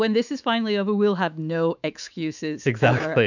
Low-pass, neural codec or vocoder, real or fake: 7.2 kHz; autoencoder, 48 kHz, 128 numbers a frame, DAC-VAE, trained on Japanese speech; fake